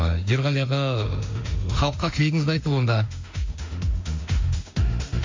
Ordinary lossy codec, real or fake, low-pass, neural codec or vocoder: MP3, 64 kbps; fake; 7.2 kHz; autoencoder, 48 kHz, 32 numbers a frame, DAC-VAE, trained on Japanese speech